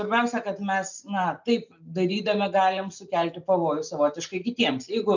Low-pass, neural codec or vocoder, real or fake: 7.2 kHz; none; real